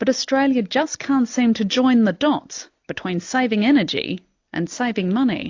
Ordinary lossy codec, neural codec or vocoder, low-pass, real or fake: AAC, 48 kbps; none; 7.2 kHz; real